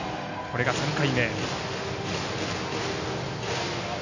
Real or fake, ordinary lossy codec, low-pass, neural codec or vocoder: real; none; 7.2 kHz; none